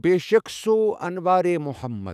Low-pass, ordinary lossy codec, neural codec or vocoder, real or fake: 14.4 kHz; none; autoencoder, 48 kHz, 128 numbers a frame, DAC-VAE, trained on Japanese speech; fake